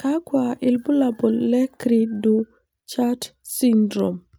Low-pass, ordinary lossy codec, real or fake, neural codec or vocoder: none; none; real; none